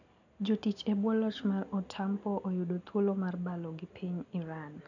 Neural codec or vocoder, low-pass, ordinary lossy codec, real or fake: none; 7.2 kHz; none; real